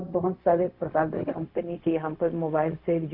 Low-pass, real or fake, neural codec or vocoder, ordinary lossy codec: 5.4 kHz; fake; codec, 16 kHz, 0.4 kbps, LongCat-Audio-Codec; none